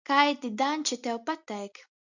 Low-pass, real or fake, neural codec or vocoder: 7.2 kHz; real; none